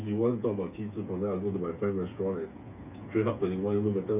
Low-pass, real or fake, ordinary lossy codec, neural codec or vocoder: 3.6 kHz; fake; none; codec, 16 kHz, 8 kbps, FreqCodec, smaller model